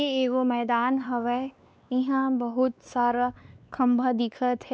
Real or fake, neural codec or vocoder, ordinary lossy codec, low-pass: fake; codec, 16 kHz, 2 kbps, X-Codec, WavLM features, trained on Multilingual LibriSpeech; none; none